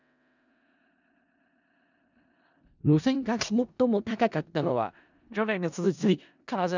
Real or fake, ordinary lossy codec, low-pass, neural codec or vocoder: fake; none; 7.2 kHz; codec, 16 kHz in and 24 kHz out, 0.4 kbps, LongCat-Audio-Codec, four codebook decoder